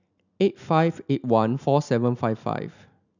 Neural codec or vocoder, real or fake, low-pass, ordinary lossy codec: none; real; 7.2 kHz; none